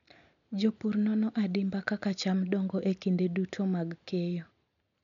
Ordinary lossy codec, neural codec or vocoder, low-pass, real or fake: none; none; 7.2 kHz; real